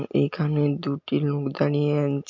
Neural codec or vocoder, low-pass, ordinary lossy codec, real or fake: none; 7.2 kHz; MP3, 48 kbps; real